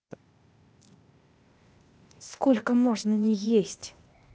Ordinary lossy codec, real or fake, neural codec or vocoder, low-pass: none; fake; codec, 16 kHz, 0.8 kbps, ZipCodec; none